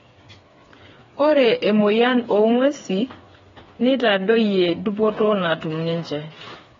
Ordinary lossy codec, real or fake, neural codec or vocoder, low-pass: AAC, 24 kbps; fake; codec, 16 kHz, 4 kbps, FreqCodec, smaller model; 7.2 kHz